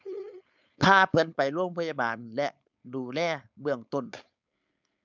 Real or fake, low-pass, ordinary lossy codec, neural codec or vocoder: fake; 7.2 kHz; none; codec, 16 kHz, 4.8 kbps, FACodec